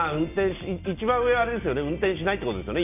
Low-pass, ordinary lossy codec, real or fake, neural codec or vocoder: 3.6 kHz; none; real; none